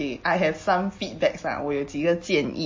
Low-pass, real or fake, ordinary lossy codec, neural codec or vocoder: 7.2 kHz; real; MP3, 32 kbps; none